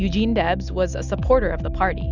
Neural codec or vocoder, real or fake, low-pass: none; real; 7.2 kHz